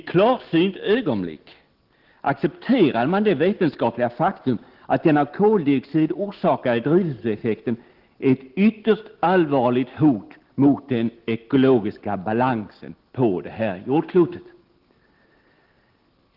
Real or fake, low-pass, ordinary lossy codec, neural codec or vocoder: real; 5.4 kHz; Opus, 16 kbps; none